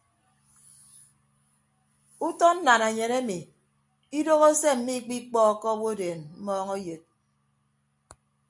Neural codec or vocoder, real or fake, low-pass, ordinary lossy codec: none; real; 10.8 kHz; AAC, 64 kbps